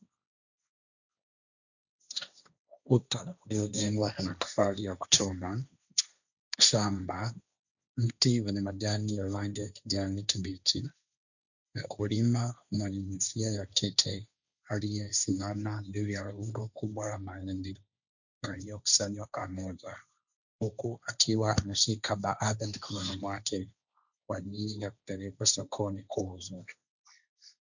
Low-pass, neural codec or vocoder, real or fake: 7.2 kHz; codec, 16 kHz, 1.1 kbps, Voila-Tokenizer; fake